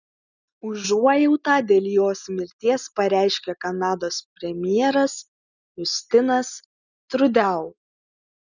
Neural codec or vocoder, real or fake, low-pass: none; real; 7.2 kHz